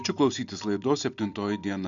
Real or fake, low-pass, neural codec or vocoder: real; 7.2 kHz; none